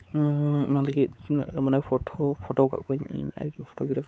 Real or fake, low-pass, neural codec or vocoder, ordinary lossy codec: fake; none; codec, 16 kHz, 4 kbps, X-Codec, WavLM features, trained on Multilingual LibriSpeech; none